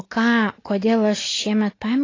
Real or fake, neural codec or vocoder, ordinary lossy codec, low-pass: real; none; AAC, 32 kbps; 7.2 kHz